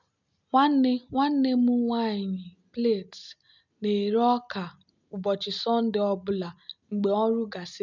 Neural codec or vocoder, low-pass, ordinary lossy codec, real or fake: none; 7.2 kHz; none; real